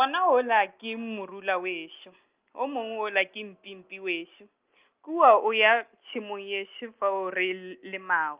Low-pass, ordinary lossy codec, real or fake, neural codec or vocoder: 3.6 kHz; Opus, 24 kbps; real; none